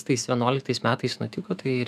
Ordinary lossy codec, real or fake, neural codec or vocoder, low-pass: AAC, 96 kbps; fake; autoencoder, 48 kHz, 128 numbers a frame, DAC-VAE, trained on Japanese speech; 14.4 kHz